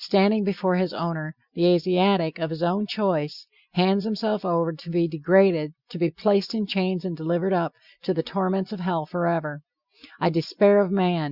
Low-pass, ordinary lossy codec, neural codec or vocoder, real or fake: 5.4 kHz; Opus, 64 kbps; none; real